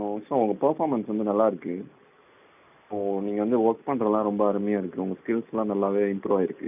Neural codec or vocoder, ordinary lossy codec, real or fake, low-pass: none; none; real; 3.6 kHz